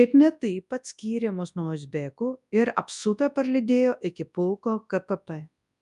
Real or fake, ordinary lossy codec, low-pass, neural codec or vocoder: fake; MP3, 96 kbps; 10.8 kHz; codec, 24 kHz, 0.9 kbps, WavTokenizer, large speech release